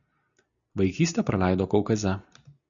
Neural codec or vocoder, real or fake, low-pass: none; real; 7.2 kHz